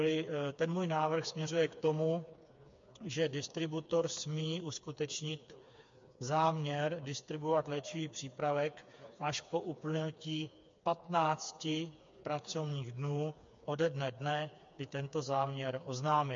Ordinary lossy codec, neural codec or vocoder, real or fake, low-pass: MP3, 48 kbps; codec, 16 kHz, 4 kbps, FreqCodec, smaller model; fake; 7.2 kHz